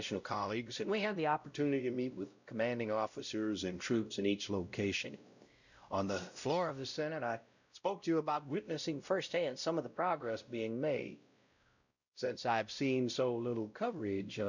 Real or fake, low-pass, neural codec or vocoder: fake; 7.2 kHz; codec, 16 kHz, 0.5 kbps, X-Codec, WavLM features, trained on Multilingual LibriSpeech